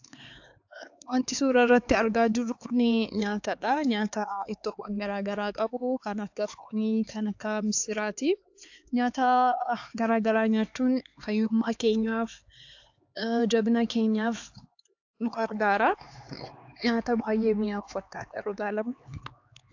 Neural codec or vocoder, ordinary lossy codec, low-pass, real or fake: codec, 16 kHz, 4 kbps, X-Codec, HuBERT features, trained on LibriSpeech; AAC, 48 kbps; 7.2 kHz; fake